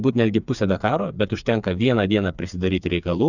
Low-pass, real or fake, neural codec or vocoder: 7.2 kHz; fake; codec, 16 kHz, 4 kbps, FreqCodec, smaller model